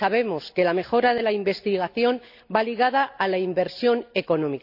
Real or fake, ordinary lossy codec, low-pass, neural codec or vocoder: real; none; 5.4 kHz; none